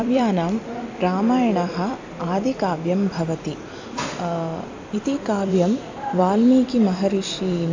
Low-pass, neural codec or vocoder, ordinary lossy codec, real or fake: 7.2 kHz; vocoder, 44.1 kHz, 128 mel bands every 256 samples, BigVGAN v2; AAC, 48 kbps; fake